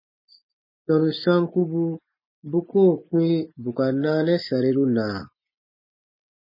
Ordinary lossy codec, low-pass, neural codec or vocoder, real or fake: MP3, 24 kbps; 5.4 kHz; none; real